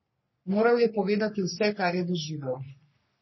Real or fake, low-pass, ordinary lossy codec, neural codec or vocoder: fake; 7.2 kHz; MP3, 24 kbps; codec, 44.1 kHz, 3.4 kbps, Pupu-Codec